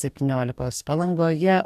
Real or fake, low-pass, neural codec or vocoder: fake; 14.4 kHz; codec, 44.1 kHz, 2.6 kbps, DAC